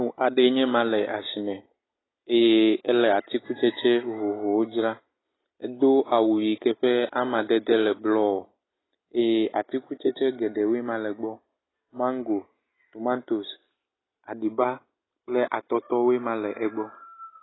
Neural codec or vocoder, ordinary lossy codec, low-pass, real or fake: none; AAC, 16 kbps; 7.2 kHz; real